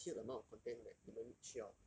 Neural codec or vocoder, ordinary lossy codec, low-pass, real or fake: none; none; none; real